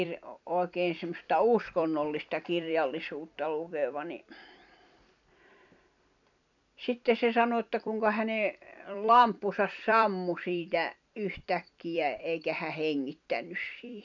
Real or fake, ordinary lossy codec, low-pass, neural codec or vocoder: fake; none; 7.2 kHz; vocoder, 44.1 kHz, 128 mel bands every 256 samples, BigVGAN v2